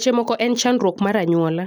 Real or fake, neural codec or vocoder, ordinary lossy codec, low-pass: real; none; none; none